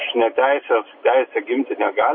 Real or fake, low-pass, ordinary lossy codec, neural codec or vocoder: real; 7.2 kHz; MP3, 24 kbps; none